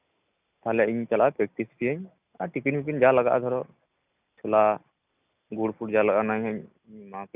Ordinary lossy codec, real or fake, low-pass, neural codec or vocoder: none; real; 3.6 kHz; none